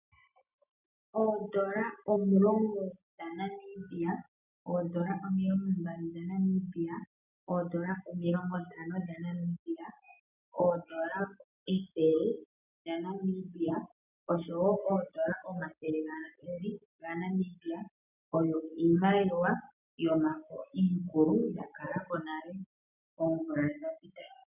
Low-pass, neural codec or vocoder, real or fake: 3.6 kHz; none; real